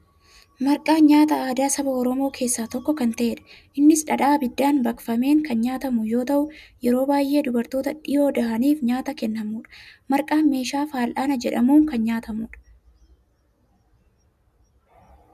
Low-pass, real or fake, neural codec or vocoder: 14.4 kHz; real; none